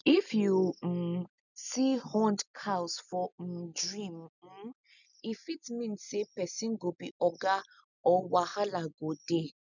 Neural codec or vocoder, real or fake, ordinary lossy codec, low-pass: none; real; none; 7.2 kHz